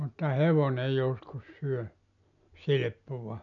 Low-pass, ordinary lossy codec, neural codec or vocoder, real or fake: 7.2 kHz; none; none; real